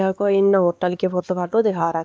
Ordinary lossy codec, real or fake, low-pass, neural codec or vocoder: none; fake; none; codec, 16 kHz, 2 kbps, X-Codec, WavLM features, trained on Multilingual LibriSpeech